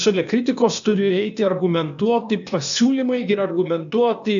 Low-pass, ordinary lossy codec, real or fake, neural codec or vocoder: 7.2 kHz; MP3, 96 kbps; fake; codec, 16 kHz, 0.8 kbps, ZipCodec